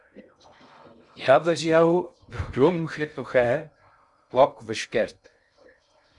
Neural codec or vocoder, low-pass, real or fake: codec, 16 kHz in and 24 kHz out, 0.6 kbps, FocalCodec, streaming, 2048 codes; 10.8 kHz; fake